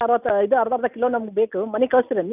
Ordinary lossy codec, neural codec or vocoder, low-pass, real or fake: none; none; 3.6 kHz; real